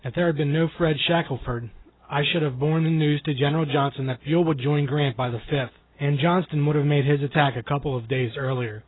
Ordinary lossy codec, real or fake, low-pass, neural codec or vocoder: AAC, 16 kbps; real; 7.2 kHz; none